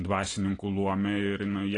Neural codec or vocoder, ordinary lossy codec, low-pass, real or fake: none; AAC, 32 kbps; 9.9 kHz; real